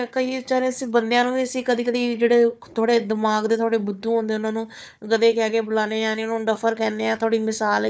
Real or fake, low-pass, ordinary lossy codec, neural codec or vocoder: fake; none; none; codec, 16 kHz, 4 kbps, FunCodec, trained on Chinese and English, 50 frames a second